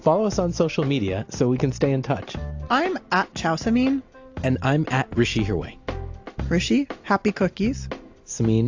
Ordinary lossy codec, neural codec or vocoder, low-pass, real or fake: AAC, 48 kbps; none; 7.2 kHz; real